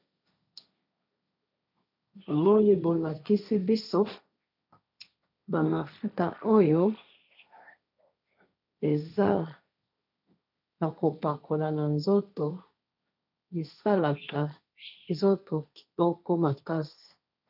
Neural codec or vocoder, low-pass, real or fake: codec, 16 kHz, 1.1 kbps, Voila-Tokenizer; 5.4 kHz; fake